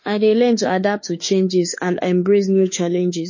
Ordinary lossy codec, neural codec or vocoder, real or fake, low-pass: MP3, 32 kbps; autoencoder, 48 kHz, 32 numbers a frame, DAC-VAE, trained on Japanese speech; fake; 7.2 kHz